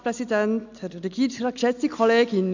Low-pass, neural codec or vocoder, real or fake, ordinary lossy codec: 7.2 kHz; none; real; none